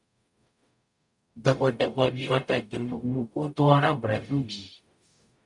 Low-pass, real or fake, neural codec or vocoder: 10.8 kHz; fake; codec, 44.1 kHz, 0.9 kbps, DAC